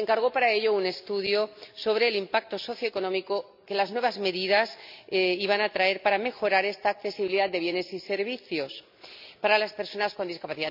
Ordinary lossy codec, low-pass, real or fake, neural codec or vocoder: MP3, 48 kbps; 5.4 kHz; real; none